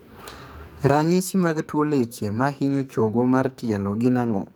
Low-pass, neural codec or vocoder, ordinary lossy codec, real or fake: none; codec, 44.1 kHz, 2.6 kbps, SNAC; none; fake